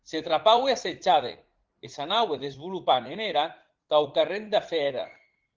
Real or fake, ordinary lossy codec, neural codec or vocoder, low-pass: fake; Opus, 16 kbps; vocoder, 44.1 kHz, 80 mel bands, Vocos; 7.2 kHz